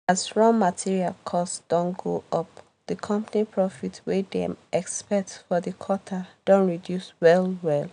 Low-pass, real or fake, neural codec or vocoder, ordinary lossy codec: 9.9 kHz; real; none; none